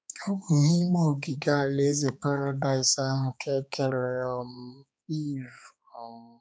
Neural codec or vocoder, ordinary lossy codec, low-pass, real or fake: codec, 16 kHz, 4 kbps, X-Codec, HuBERT features, trained on balanced general audio; none; none; fake